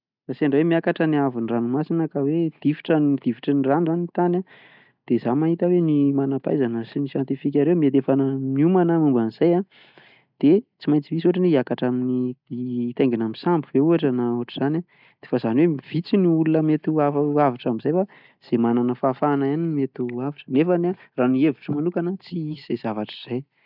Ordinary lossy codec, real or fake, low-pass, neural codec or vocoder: none; real; 5.4 kHz; none